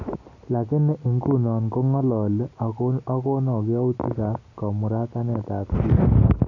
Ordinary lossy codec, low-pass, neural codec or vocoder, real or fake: none; 7.2 kHz; none; real